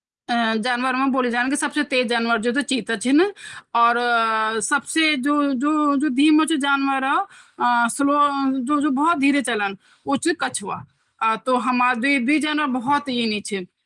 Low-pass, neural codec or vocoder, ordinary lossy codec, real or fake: 10.8 kHz; none; Opus, 24 kbps; real